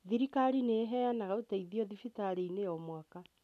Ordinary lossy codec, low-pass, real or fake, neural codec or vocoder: none; 14.4 kHz; real; none